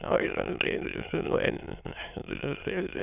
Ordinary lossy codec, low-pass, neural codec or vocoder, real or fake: none; 3.6 kHz; autoencoder, 22.05 kHz, a latent of 192 numbers a frame, VITS, trained on many speakers; fake